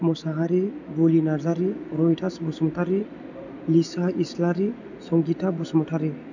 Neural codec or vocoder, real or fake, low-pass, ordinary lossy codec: none; real; 7.2 kHz; none